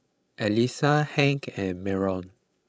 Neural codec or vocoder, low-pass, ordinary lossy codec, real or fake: codec, 16 kHz, 16 kbps, FreqCodec, larger model; none; none; fake